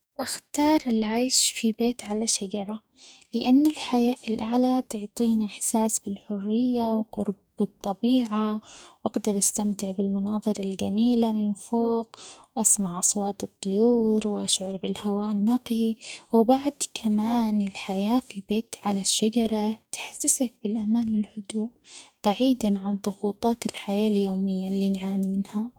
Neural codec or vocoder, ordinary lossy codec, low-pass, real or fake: codec, 44.1 kHz, 2.6 kbps, DAC; none; none; fake